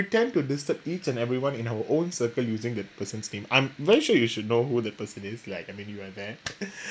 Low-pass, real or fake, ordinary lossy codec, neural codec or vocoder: none; real; none; none